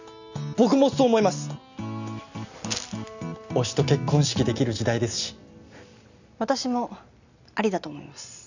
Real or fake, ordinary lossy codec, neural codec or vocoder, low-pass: real; none; none; 7.2 kHz